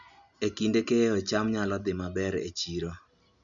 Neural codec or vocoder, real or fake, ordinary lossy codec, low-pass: none; real; none; 7.2 kHz